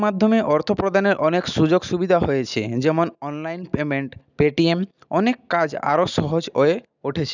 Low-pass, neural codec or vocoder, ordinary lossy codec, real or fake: 7.2 kHz; none; none; real